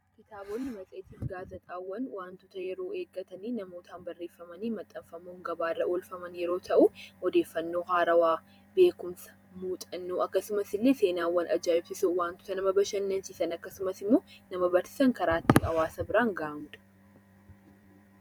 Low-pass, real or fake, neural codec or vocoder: 19.8 kHz; fake; vocoder, 44.1 kHz, 128 mel bands every 512 samples, BigVGAN v2